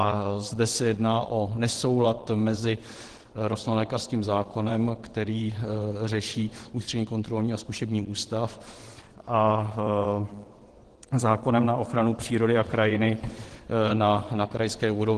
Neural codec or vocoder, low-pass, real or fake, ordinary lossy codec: vocoder, 22.05 kHz, 80 mel bands, WaveNeXt; 9.9 kHz; fake; Opus, 16 kbps